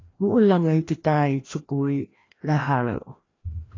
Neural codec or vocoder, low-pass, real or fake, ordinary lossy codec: codec, 16 kHz, 1 kbps, FreqCodec, larger model; 7.2 kHz; fake; AAC, 32 kbps